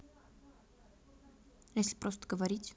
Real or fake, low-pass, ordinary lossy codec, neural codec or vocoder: real; none; none; none